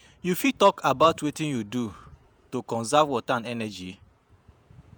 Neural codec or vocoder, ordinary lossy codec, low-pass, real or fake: vocoder, 48 kHz, 128 mel bands, Vocos; none; none; fake